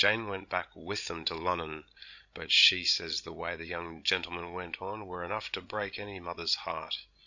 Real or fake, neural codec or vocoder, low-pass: fake; codec, 16 kHz, 16 kbps, FreqCodec, larger model; 7.2 kHz